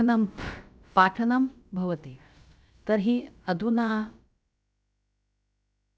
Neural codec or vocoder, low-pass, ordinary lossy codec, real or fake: codec, 16 kHz, about 1 kbps, DyCAST, with the encoder's durations; none; none; fake